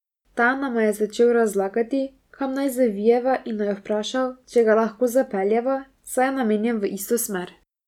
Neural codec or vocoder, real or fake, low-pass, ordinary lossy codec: none; real; 19.8 kHz; none